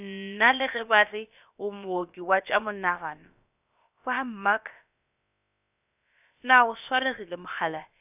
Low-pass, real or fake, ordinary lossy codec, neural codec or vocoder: 3.6 kHz; fake; none; codec, 16 kHz, about 1 kbps, DyCAST, with the encoder's durations